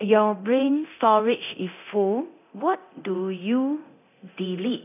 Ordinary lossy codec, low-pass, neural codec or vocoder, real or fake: none; 3.6 kHz; codec, 24 kHz, 0.9 kbps, DualCodec; fake